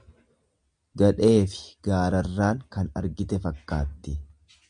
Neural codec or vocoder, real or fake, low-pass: none; real; 9.9 kHz